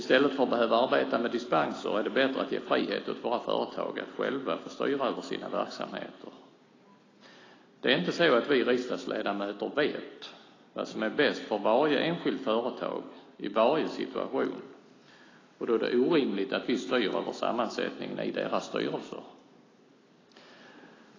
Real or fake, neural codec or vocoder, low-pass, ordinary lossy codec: real; none; 7.2 kHz; AAC, 32 kbps